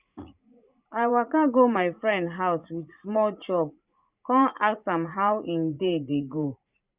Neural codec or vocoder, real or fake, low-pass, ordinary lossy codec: vocoder, 44.1 kHz, 80 mel bands, Vocos; fake; 3.6 kHz; Opus, 64 kbps